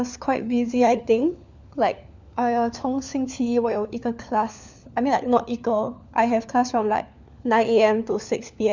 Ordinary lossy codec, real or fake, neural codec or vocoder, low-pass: none; fake; codec, 16 kHz, 4 kbps, FunCodec, trained on LibriTTS, 50 frames a second; 7.2 kHz